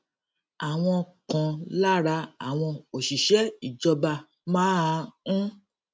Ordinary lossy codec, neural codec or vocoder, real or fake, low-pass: none; none; real; none